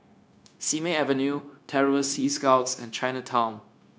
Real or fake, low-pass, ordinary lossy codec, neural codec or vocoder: fake; none; none; codec, 16 kHz, 0.9 kbps, LongCat-Audio-Codec